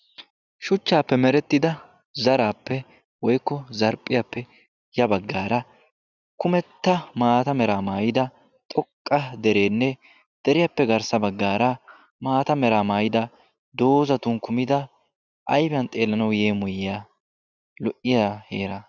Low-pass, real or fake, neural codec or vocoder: 7.2 kHz; real; none